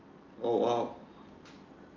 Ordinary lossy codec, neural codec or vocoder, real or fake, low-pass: Opus, 24 kbps; none; real; 7.2 kHz